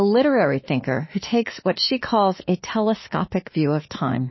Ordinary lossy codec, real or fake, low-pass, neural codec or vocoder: MP3, 24 kbps; fake; 7.2 kHz; autoencoder, 48 kHz, 32 numbers a frame, DAC-VAE, trained on Japanese speech